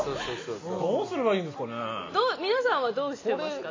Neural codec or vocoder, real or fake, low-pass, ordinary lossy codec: none; real; 7.2 kHz; AAC, 32 kbps